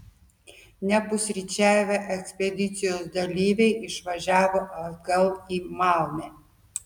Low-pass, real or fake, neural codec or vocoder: 19.8 kHz; real; none